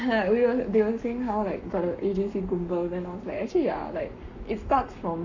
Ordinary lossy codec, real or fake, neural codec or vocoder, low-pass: none; fake; codec, 44.1 kHz, 7.8 kbps, Pupu-Codec; 7.2 kHz